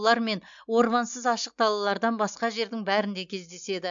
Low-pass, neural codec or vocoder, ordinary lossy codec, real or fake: 7.2 kHz; none; MP3, 64 kbps; real